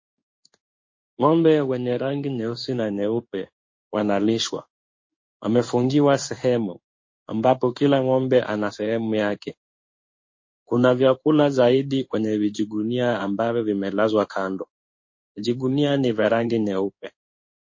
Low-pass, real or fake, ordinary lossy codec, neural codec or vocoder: 7.2 kHz; fake; MP3, 32 kbps; codec, 16 kHz in and 24 kHz out, 1 kbps, XY-Tokenizer